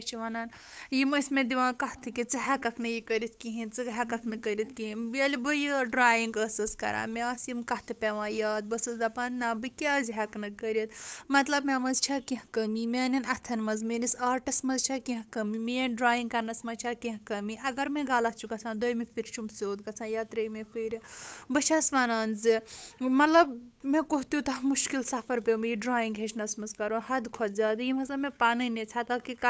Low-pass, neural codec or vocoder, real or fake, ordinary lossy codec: none; codec, 16 kHz, 8 kbps, FunCodec, trained on Chinese and English, 25 frames a second; fake; none